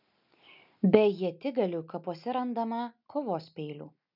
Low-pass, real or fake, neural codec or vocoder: 5.4 kHz; real; none